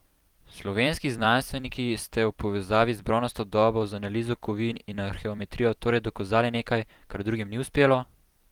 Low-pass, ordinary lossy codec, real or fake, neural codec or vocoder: 19.8 kHz; Opus, 24 kbps; fake; vocoder, 44.1 kHz, 128 mel bands every 256 samples, BigVGAN v2